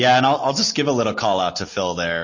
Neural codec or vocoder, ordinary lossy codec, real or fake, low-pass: none; MP3, 32 kbps; real; 7.2 kHz